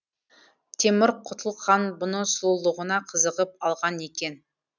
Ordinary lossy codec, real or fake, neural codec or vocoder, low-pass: none; real; none; 7.2 kHz